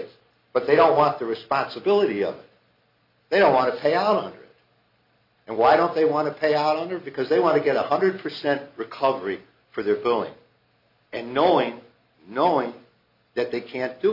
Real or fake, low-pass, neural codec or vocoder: real; 5.4 kHz; none